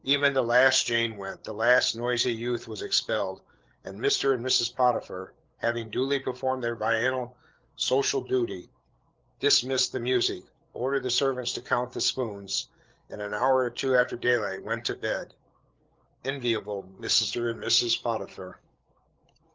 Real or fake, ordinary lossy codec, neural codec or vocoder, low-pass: fake; Opus, 16 kbps; codec, 16 kHz, 8 kbps, FreqCodec, larger model; 7.2 kHz